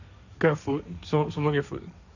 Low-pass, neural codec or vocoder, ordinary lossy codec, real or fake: 7.2 kHz; codec, 16 kHz, 1.1 kbps, Voila-Tokenizer; none; fake